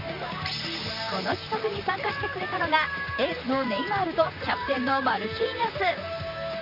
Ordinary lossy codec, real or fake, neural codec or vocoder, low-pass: none; fake; vocoder, 44.1 kHz, 128 mel bands, Pupu-Vocoder; 5.4 kHz